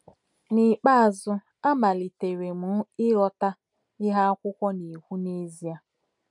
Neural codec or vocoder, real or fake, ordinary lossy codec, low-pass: none; real; none; 10.8 kHz